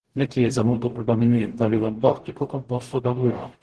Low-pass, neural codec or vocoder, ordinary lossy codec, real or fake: 10.8 kHz; codec, 44.1 kHz, 0.9 kbps, DAC; Opus, 16 kbps; fake